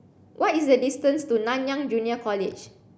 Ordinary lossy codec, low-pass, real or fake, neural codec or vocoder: none; none; real; none